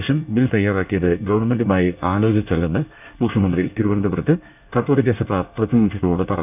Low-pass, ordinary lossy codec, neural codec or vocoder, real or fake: 3.6 kHz; none; codec, 24 kHz, 1 kbps, SNAC; fake